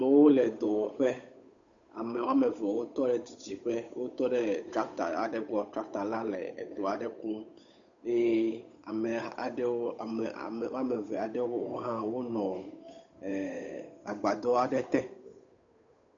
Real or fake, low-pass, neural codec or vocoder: fake; 7.2 kHz; codec, 16 kHz, 8 kbps, FunCodec, trained on Chinese and English, 25 frames a second